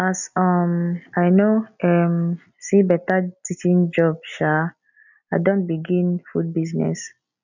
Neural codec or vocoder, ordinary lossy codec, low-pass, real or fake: none; none; 7.2 kHz; real